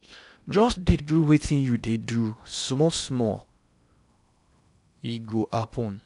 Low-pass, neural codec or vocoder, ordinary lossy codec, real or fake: 10.8 kHz; codec, 16 kHz in and 24 kHz out, 0.8 kbps, FocalCodec, streaming, 65536 codes; none; fake